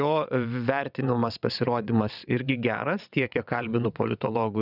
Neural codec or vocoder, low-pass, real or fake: vocoder, 22.05 kHz, 80 mel bands, WaveNeXt; 5.4 kHz; fake